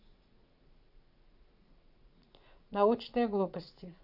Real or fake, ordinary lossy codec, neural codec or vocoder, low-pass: fake; none; vocoder, 44.1 kHz, 80 mel bands, Vocos; 5.4 kHz